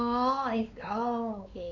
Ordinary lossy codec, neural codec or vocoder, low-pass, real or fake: MP3, 64 kbps; codec, 16 kHz, 4 kbps, X-Codec, WavLM features, trained on Multilingual LibriSpeech; 7.2 kHz; fake